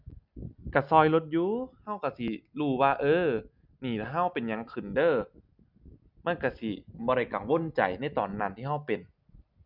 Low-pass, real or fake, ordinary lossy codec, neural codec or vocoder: 5.4 kHz; real; none; none